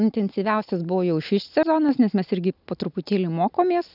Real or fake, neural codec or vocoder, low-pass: real; none; 5.4 kHz